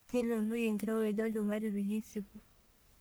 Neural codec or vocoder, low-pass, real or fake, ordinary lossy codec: codec, 44.1 kHz, 1.7 kbps, Pupu-Codec; none; fake; none